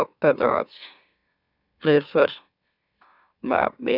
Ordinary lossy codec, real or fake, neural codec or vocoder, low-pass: none; fake; autoencoder, 44.1 kHz, a latent of 192 numbers a frame, MeloTTS; 5.4 kHz